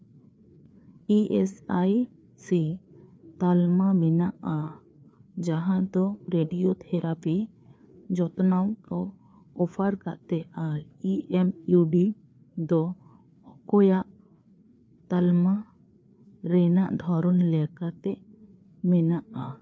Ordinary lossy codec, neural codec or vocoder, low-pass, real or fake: none; codec, 16 kHz, 4 kbps, FreqCodec, larger model; none; fake